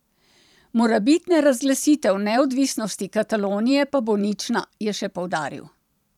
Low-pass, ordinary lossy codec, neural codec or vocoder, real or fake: 19.8 kHz; none; vocoder, 44.1 kHz, 128 mel bands every 512 samples, BigVGAN v2; fake